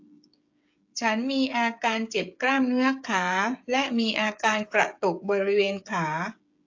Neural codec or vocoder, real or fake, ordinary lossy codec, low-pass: codec, 16 kHz, 16 kbps, FreqCodec, smaller model; fake; AAC, 48 kbps; 7.2 kHz